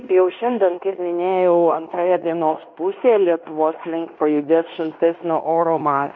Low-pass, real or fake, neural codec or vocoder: 7.2 kHz; fake; codec, 16 kHz in and 24 kHz out, 0.9 kbps, LongCat-Audio-Codec, fine tuned four codebook decoder